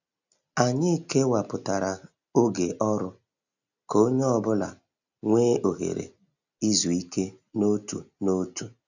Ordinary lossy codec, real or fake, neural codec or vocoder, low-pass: none; real; none; 7.2 kHz